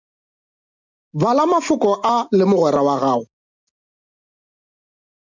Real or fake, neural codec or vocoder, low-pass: real; none; 7.2 kHz